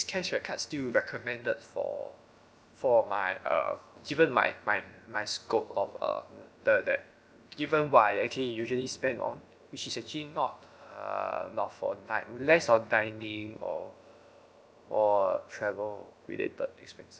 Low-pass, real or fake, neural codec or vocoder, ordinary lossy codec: none; fake; codec, 16 kHz, about 1 kbps, DyCAST, with the encoder's durations; none